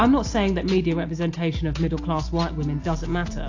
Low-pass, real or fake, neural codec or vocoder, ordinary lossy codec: 7.2 kHz; real; none; AAC, 48 kbps